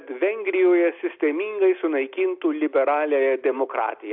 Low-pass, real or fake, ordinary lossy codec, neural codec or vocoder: 5.4 kHz; real; AAC, 48 kbps; none